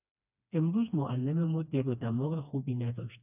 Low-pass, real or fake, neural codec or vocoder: 3.6 kHz; fake; codec, 16 kHz, 2 kbps, FreqCodec, smaller model